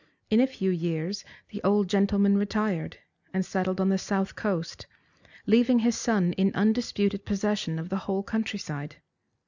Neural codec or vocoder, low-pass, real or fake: none; 7.2 kHz; real